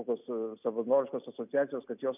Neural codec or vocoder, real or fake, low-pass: none; real; 3.6 kHz